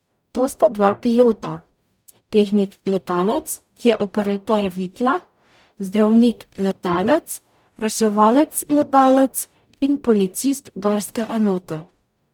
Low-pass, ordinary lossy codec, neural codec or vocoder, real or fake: 19.8 kHz; none; codec, 44.1 kHz, 0.9 kbps, DAC; fake